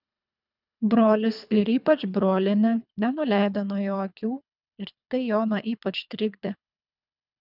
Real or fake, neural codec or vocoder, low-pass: fake; codec, 24 kHz, 3 kbps, HILCodec; 5.4 kHz